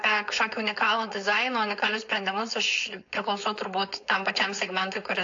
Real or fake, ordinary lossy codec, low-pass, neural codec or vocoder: fake; AAC, 48 kbps; 7.2 kHz; codec, 16 kHz, 4.8 kbps, FACodec